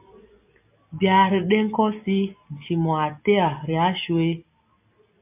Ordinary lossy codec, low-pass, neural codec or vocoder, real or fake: MP3, 32 kbps; 3.6 kHz; none; real